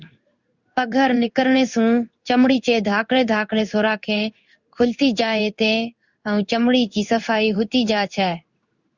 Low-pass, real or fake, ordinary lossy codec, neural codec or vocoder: 7.2 kHz; fake; Opus, 64 kbps; codec, 16 kHz in and 24 kHz out, 1 kbps, XY-Tokenizer